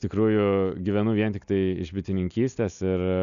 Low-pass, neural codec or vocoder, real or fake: 7.2 kHz; none; real